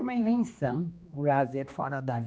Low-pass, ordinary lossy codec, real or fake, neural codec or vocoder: none; none; fake; codec, 16 kHz, 2 kbps, X-Codec, HuBERT features, trained on general audio